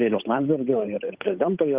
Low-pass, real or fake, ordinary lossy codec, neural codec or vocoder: 3.6 kHz; fake; Opus, 24 kbps; codec, 16 kHz, 8 kbps, FunCodec, trained on Chinese and English, 25 frames a second